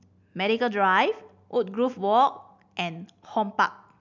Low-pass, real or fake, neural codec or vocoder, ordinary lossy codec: 7.2 kHz; real; none; none